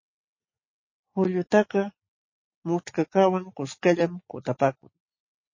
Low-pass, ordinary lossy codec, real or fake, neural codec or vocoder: 7.2 kHz; MP3, 32 kbps; real; none